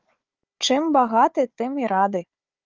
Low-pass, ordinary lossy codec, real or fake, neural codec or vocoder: 7.2 kHz; Opus, 24 kbps; fake; codec, 16 kHz, 4 kbps, FunCodec, trained on Chinese and English, 50 frames a second